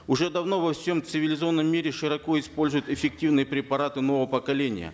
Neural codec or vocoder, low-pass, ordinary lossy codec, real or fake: none; none; none; real